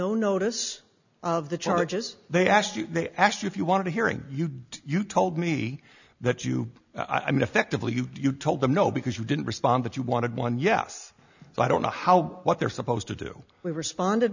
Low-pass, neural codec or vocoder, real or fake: 7.2 kHz; none; real